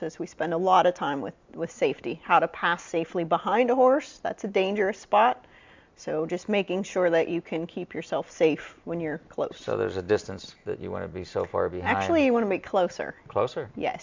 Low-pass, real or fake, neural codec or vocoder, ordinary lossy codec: 7.2 kHz; real; none; MP3, 64 kbps